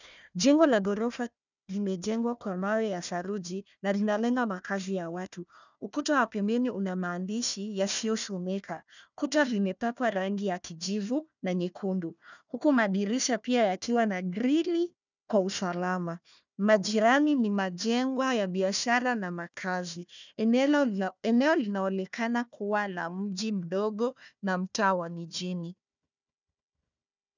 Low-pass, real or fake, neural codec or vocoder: 7.2 kHz; fake; codec, 16 kHz, 1 kbps, FunCodec, trained on Chinese and English, 50 frames a second